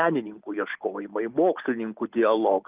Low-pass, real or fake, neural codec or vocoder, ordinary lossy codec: 3.6 kHz; real; none; Opus, 24 kbps